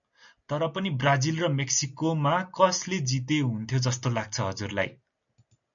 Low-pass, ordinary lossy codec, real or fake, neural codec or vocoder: 7.2 kHz; MP3, 96 kbps; real; none